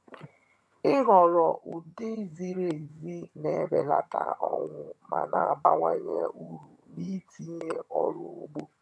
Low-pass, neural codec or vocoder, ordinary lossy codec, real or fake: none; vocoder, 22.05 kHz, 80 mel bands, HiFi-GAN; none; fake